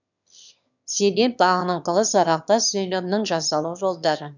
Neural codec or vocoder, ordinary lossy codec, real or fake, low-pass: autoencoder, 22.05 kHz, a latent of 192 numbers a frame, VITS, trained on one speaker; none; fake; 7.2 kHz